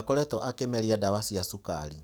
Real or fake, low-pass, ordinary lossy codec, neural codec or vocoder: fake; none; none; codec, 44.1 kHz, 7.8 kbps, DAC